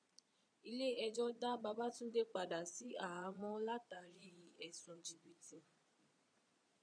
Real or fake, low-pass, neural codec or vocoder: fake; 9.9 kHz; vocoder, 22.05 kHz, 80 mel bands, Vocos